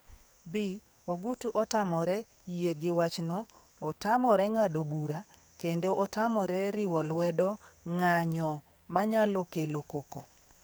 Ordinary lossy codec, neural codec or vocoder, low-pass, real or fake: none; codec, 44.1 kHz, 2.6 kbps, SNAC; none; fake